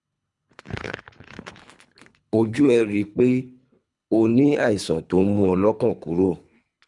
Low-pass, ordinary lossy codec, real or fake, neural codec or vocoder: 10.8 kHz; MP3, 96 kbps; fake; codec, 24 kHz, 3 kbps, HILCodec